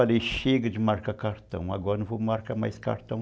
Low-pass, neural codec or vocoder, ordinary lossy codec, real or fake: none; none; none; real